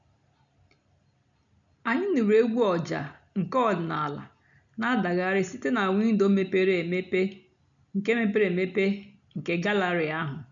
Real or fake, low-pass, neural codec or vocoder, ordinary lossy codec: real; 7.2 kHz; none; none